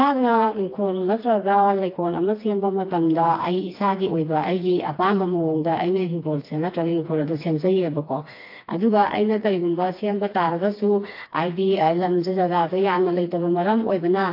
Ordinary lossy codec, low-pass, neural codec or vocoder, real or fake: AAC, 32 kbps; 5.4 kHz; codec, 16 kHz, 2 kbps, FreqCodec, smaller model; fake